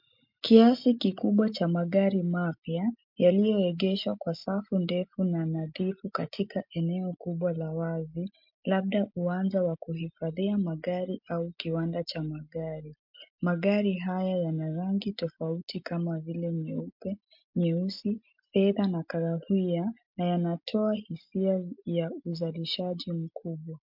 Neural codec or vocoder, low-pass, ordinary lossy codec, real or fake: none; 5.4 kHz; MP3, 48 kbps; real